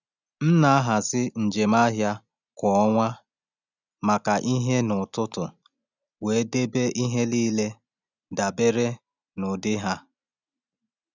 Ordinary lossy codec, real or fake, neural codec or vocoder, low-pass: none; real; none; 7.2 kHz